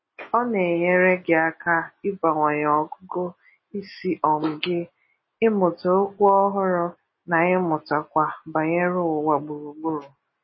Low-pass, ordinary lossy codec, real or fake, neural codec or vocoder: 7.2 kHz; MP3, 24 kbps; real; none